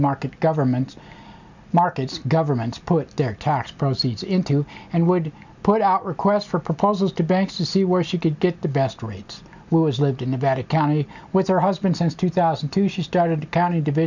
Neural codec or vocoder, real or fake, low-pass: none; real; 7.2 kHz